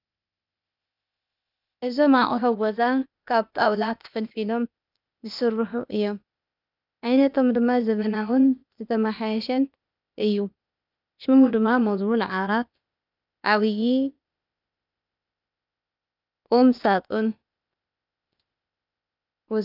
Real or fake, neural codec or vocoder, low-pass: fake; codec, 16 kHz, 0.8 kbps, ZipCodec; 5.4 kHz